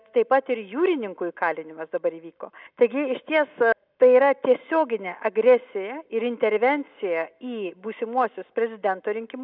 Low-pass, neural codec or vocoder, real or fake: 5.4 kHz; none; real